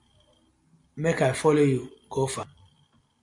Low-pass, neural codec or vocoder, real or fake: 10.8 kHz; none; real